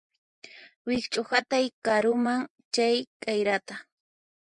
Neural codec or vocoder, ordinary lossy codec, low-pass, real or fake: vocoder, 24 kHz, 100 mel bands, Vocos; MP3, 96 kbps; 10.8 kHz; fake